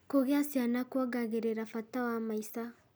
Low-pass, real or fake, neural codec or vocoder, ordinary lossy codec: none; real; none; none